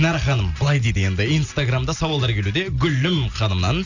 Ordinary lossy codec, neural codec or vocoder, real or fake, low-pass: none; none; real; 7.2 kHz